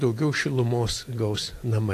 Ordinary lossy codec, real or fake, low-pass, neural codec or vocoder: AAC, 48 kbps; real; 14.4 kHz; none